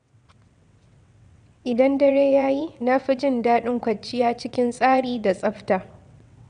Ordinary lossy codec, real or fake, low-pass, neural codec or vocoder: none; fake; 9.9 kHz; vocoder, 22.05 kHz, 80 mel bands, WaveNeXt